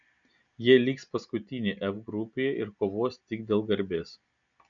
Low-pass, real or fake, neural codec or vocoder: 7.2 kHz; real; none